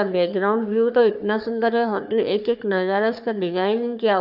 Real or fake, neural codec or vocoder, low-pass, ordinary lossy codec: fake; codec, 44.1 kHz, 3.4 kbps, Pupu-Codec; 5.4 kHz; none